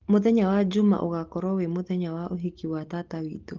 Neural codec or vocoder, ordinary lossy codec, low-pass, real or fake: none; Opus, 16 kbps; 7.2 kHz; real